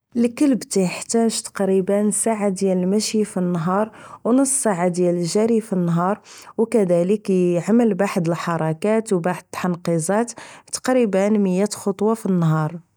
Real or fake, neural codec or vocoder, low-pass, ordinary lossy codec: real; none; none; none